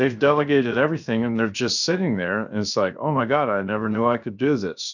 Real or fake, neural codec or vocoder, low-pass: fake; codec, 16 kHz, 0.7 kbps, FocalCodec; 7.2 kHz